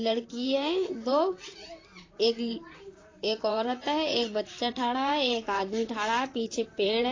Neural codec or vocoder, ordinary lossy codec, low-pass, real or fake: vocoder, 44.1 kHz, 128 mel bands, Pupu-Vocoder; AAC, 32 kbps; 7.2 kHz; fake